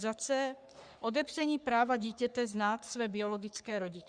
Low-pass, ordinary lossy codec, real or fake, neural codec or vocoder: 9.9 kHz; Opus, 64 kbps; fake; codec, 44.1 kHz, 3.4 kbps, Pupu-Codec